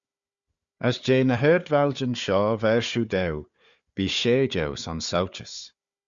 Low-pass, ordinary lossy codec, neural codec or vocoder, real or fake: 7.2 kHz; Opus, 64 kbps; codec, 16 kHz, 4 kbps, FunCodec, trained on Chinese and English, 50 frames a second; fake